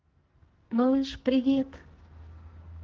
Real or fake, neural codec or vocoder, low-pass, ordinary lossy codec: fake; codec, 44.1 kHz, 2.6 kbps, SNAC; 7.2 kHz; Opus, 16 kbps